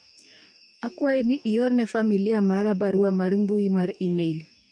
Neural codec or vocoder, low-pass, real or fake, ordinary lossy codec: codec, 44.1 kHz, 2.6 kbps, DAC; 9.9 kHz; fake; none